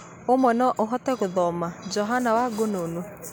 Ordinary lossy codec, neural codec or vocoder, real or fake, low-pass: none; none; real; none